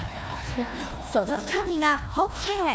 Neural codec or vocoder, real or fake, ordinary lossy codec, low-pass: codec, 16 kHz, 1 kbps, FunCodec, trained on Chinese and English, 50 frames a second; fake; none; none